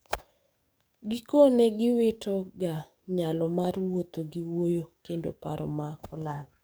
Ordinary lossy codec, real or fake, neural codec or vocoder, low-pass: none; fake; codec, 44.1 kHz, 7.8 kbps, DAC; none